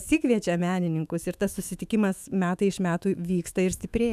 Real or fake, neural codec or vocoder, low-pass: fake; autoencoder, 48 kHz, 128 numbers a frame, DAC-VAE, trained on Japanese speech; 14.4 kHz